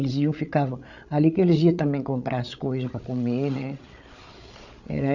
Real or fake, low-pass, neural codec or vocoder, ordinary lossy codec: fake; 7.2 kHz; codec, 16 kHz, 8 kbps, FreqCodec, larger model; none